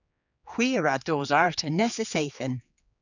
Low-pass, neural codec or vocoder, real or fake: 7.2 kHz; codec, 16 kHz, 4 kbps, X-Codec, HuBERT features, trained on general audio; fake